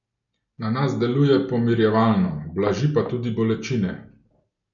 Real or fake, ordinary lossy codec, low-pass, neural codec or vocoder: real; none; 7.2 kHz; none